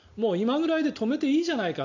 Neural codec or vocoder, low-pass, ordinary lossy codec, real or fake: none; 7.2 kHz; none; real